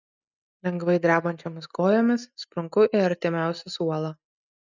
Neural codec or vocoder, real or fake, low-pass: none; real; 7.2 kHz